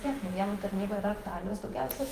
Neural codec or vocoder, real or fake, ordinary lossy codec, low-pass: vocoder, 48 kHz, 128 mel bands, Vocos; fake; Opus, 24 kbps; 14.4 kHz